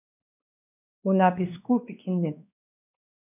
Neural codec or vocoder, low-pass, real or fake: codec, 16 kHz, 2 kbps, X-Codec, WavLM features, trained on Multilingual LibriSpeech; 3.6 kHz; fake